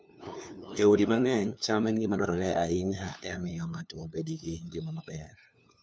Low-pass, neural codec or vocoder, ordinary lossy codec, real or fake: none; codec, 16 kHz, 2 kbps, FunCodec, trained on LibriTTS, 25 frames a second; none; fake